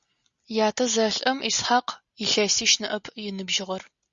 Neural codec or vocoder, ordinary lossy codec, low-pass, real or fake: none; Opus, 64 kbps; 7.2 kHz; real